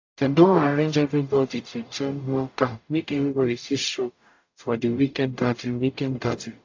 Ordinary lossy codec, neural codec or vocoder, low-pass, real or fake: AAC, 48 kbps; codec, 44.1 kHz, 0.9 kbps, DAC; 7.2 kHz; fake